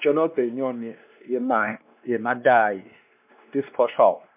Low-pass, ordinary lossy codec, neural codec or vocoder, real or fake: 3.6 kHz; MP3, 32 kbps; codec, 16 kHz, 2 kbps, X-Codec, WavLM features, trained on Multilingual LibriSpeech; fake